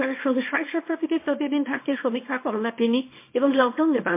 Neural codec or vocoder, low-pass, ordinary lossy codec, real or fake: codec, 24 kHz, 0.9 kbps, WavTokenizer, small release; 3.6 kHz; MP3, 24 kbps; fake